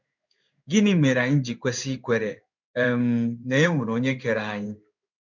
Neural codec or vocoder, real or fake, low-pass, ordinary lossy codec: codec, 16 kHz in and 24 kHz out, 1 kbps, XY-Tokenizer; fake; 7.2 kHz; none